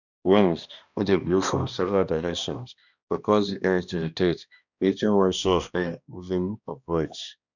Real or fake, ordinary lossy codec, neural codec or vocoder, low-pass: fake; none; codec, 16 kHz, 1 kbps, X-Codec, HuBERT features, trained on balanced general audio; 7.2 kHz